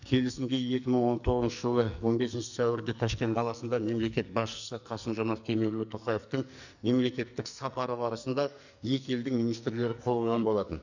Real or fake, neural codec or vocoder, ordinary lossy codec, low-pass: fake; codec, 44.1 kHz, 2.6 kbps, SNAC; none; 7.2 kHz